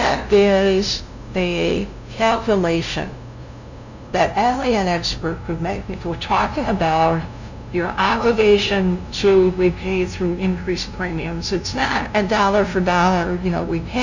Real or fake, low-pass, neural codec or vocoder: fake; 7.2 kHz; codec, 16 kHz, 0.5 kbps, FunCodec, trained on LibriTTS, 25 frames a second